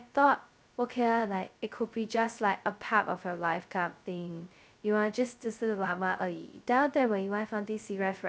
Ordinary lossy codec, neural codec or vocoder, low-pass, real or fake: none; codec, 16 kHz, 0.2 kbps, FocalCodec; none; fake